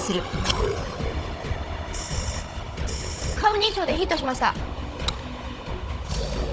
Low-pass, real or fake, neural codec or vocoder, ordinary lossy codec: none; fake; codec, 16 kHz, 16 kbps, FunCodec, trained on Chinese and English, 50 frames a second; none